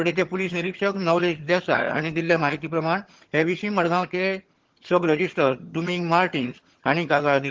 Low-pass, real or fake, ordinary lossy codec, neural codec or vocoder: 7.2 kHz; fake; Opus, 16 kbps; vocoder, 22.05 kHz, 80 mel bands, HiFi-GAN